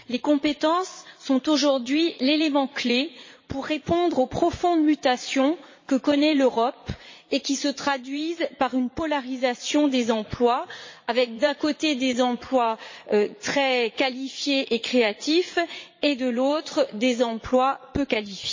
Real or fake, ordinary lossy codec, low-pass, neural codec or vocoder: fake; MP3, 32 kbps; 7.2 kHz; vocoder, 44.1 kHz, 80 mel bands, Vocos